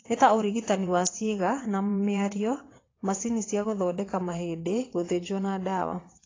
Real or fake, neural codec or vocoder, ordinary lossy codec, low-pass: fake; vocoder, 22.05 kHz, 80 mel bands, WaveNeXt; AAC, 32 kbps; 7.2 kHz